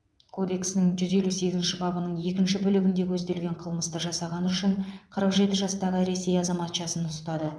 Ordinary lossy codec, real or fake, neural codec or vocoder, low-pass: none; fake; vocoder, 22.05 kHz, 80 mel bands, WaveNeXt; none